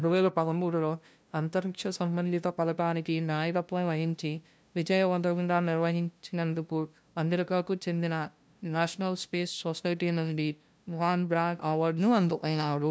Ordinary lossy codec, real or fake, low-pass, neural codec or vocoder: none; fake; none; codec, 16 kHz, 0.5 kbps, FunCodec, trained on LibriTTS, 25 frames a second